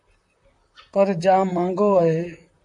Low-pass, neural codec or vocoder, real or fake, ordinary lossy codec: 10.8 kHz; vocoder, 44.1 kHz, 128 mel bands, Pupu-Vocoder; fake; AAC, 64 kbps